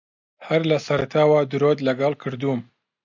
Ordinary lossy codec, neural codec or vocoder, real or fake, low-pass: MP3, 64 kbps; none; real; 7.2 kHz